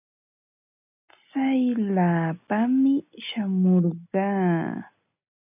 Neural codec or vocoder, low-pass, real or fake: none; 3.6 kHz; real